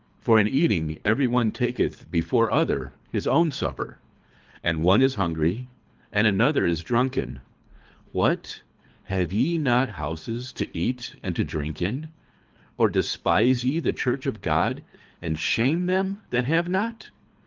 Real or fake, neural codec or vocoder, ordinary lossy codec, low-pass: fake; codec, 24 kHz, 3 kbps, HILCodec; Opus, 32 kbps; 7.2 kHz